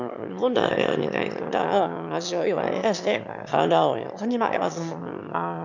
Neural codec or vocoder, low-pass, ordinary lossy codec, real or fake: autoencoder, 22.05 kHz, a latent of 192 numbers a frame, VITS, trained on one speaker; 7.2 kHz; none; fake